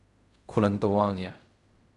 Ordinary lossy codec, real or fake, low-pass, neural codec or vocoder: none; fake; 10.8 kHz; codec, 16 kHz in and 24 kHz out, 0.4 kbps, LongCat-Audio-Codec, fine tuned four codebook decoder